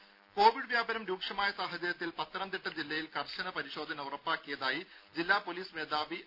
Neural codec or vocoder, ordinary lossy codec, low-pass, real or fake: none; none; 5.4 kHz; real